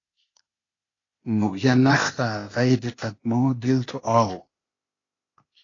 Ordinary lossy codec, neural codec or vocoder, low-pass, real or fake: AAC, 32 kbps; codec, 16 kHz, 0.8 kbps, ZipCodec; 7.2 kHz; fake